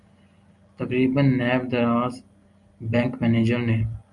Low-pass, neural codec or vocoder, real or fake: 10.8 kHz; none; real